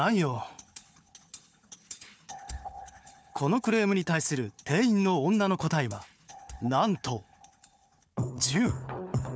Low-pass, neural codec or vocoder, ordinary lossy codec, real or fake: none; codec, 16 kHz, 4 kbps, FunCodec, trained on Chinese and English, 50 frames a second; none; fake